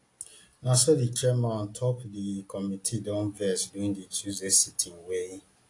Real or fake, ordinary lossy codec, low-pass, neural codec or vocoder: real; AAC, 48 kbps; 10.8 kHz; none